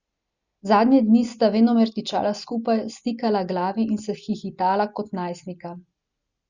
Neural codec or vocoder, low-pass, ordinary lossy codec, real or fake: none; 7.2 kHz; Opus, 64 kbps; real